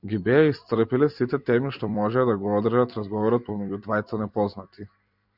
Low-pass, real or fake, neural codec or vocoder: 5.4 kHz; fake; vocoder, 44.1 kHz, 128 mel bands every 256 samples, BigVGAN v2